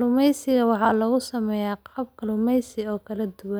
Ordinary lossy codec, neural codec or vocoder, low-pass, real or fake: none; none; none; real